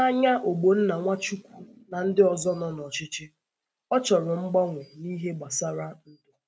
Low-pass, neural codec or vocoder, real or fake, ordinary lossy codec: none; none; real; none